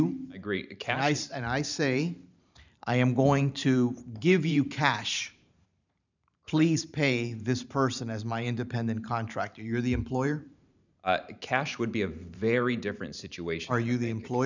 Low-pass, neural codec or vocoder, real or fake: 7.2 kHz; vocoder, 44.1 kHz, 128 mel bands every 512 samples, BigVGAN v2; fake